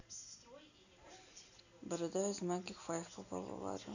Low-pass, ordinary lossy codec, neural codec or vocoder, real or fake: 7.2 kHz; none; none; real